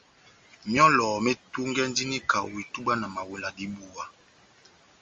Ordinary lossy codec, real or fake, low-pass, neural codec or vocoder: Opus, 32 kbps; real; 7.2 kHz; none